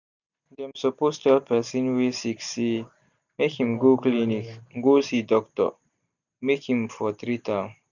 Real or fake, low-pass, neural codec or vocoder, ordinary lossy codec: real; 7.2 kHz; none; none